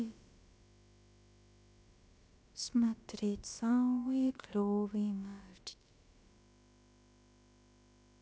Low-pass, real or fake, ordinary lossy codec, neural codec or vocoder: none; fake; none; codec, 16 kHz, about 1 kbps, DyCAST, with the encoder's durations